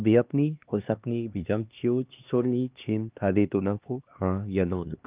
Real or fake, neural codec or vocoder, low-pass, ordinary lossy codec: fake; codec, 16 kHz, 2 kbps, X-Codec, HuBERT features, trained on LibriSpeech; 3.6 kHz; Opus, 32 kbps